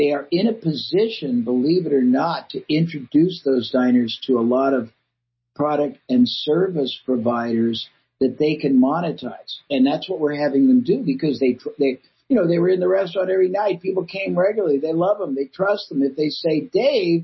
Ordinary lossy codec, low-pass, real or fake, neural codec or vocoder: MP3, 24 kbps; 7.2 kHz; real; none